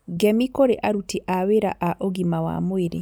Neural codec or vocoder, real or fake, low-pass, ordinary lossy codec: none; real; none; none